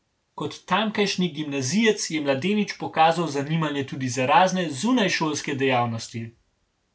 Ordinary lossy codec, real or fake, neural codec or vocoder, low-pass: none; real; none; none